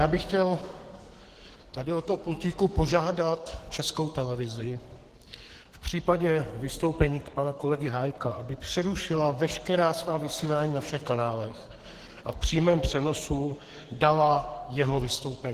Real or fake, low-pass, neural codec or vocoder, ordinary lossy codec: fake; 14.4 kHz; codec, 44.1 kHz, 2.6 kbps, SNAC; Opus, 16 kbps